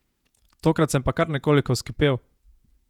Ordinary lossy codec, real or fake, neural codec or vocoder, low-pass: none; fake; vocoder, 48 kHz, 128 mel bands, Vocos; 19.8 kHz